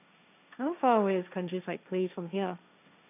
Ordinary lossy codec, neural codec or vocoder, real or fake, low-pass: none; codec, 16 kHz, 1.1 kbps, Voila-Tokenizer; fake; 3.6 kHz